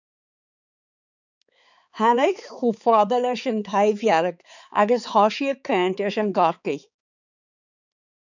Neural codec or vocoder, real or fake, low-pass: codec, 16 kHz, 4 kbps, X-Codec, HuBERT features, trained on balanced general audio; fake; 7.2 kHz